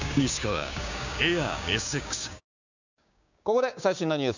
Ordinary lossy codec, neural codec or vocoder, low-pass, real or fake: none; codec, 16 kHz, 6 kbps, DAC; 7.2 kHz; fake